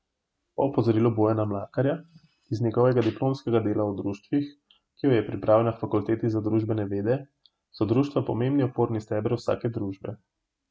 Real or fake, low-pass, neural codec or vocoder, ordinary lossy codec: real; none; none; none